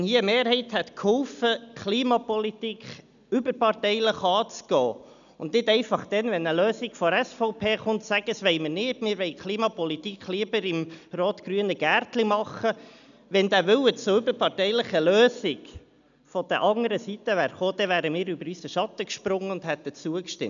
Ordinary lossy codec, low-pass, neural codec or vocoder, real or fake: none; 7.2 kHz; none; real